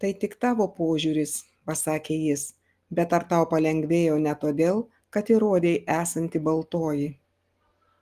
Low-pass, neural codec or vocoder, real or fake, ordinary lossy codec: 14.4 kHz; none; real; Opus, 24 kbps